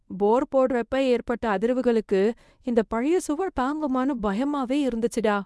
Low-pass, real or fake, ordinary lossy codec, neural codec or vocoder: none; fake; none; codec, 24 kHz, 0.9 kbps, WavTokenizer, medium speech release version 1